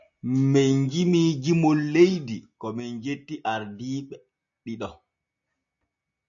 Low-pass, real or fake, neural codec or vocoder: 7.2 kHz; real; none